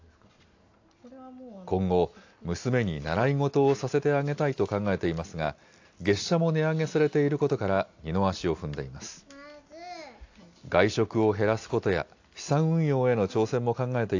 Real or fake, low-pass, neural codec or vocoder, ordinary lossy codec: real; 7.2 kHz; none; AAC, 48 kbps